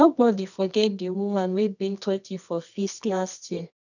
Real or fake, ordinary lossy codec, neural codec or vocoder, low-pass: fake; none; codec, 24 kHz, 0.9 kbps, WavTokenizer, medium music audio release; 7.2 kHz